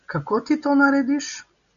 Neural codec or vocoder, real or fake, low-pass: none; real; 7.2 kHz